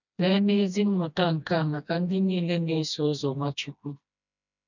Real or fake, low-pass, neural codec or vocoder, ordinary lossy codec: fake; 7.2 kHz; codec, 16 kHz, 1 kbps, FreqCodec, smaller model; none